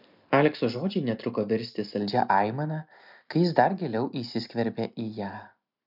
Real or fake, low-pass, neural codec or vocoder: real; 5.4 kHz; none